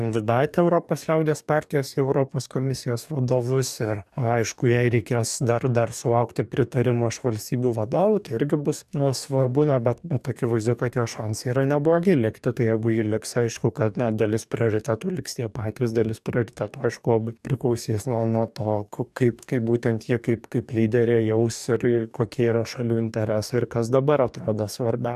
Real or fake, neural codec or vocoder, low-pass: fake; codec, 44.1 kHz, 2.6 kbps, DAC; 14.4 kHz